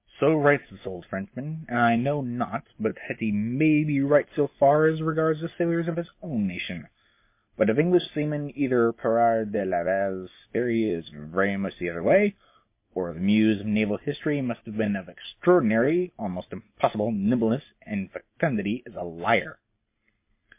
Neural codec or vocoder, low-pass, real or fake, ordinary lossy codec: none; 3.6 kHz; real; MP3, 24 kbps